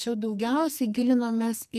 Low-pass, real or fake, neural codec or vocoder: 14.4 kHz; fake; codec, 44.1 kHz, 2.6 kbps, SNAC